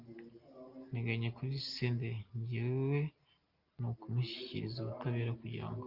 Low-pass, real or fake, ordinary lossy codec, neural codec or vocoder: 5.4 kHz; real; Opus, 32 kbps; none